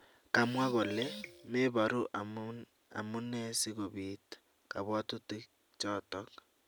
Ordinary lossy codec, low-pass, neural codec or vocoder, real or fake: none; none; none; real